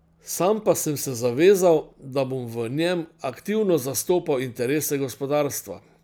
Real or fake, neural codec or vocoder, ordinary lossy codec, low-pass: real; none; none; none